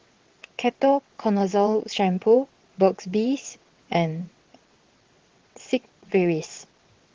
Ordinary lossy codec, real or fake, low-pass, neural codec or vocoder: Opus, 16 kbps; fake; 7.2 kHz; vocoder, 22.05 kHz, 80 mel bands, WaveNeXt